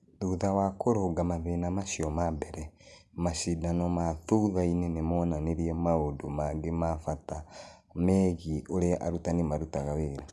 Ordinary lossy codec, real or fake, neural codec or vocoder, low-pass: none; real; none; none